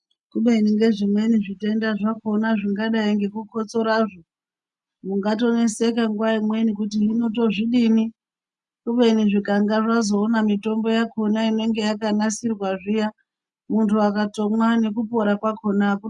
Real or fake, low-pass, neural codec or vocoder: real; 10.8 kHz; none